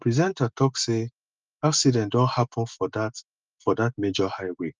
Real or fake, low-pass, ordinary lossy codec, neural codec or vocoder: real; 7.2 kHz; Opus, 24 kbps; none